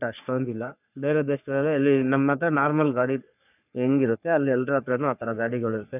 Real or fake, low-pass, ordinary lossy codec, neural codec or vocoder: fake; 3.6 kHz; none; codec, 44.1 kHz, 3.4 kbps, Pupu-Codec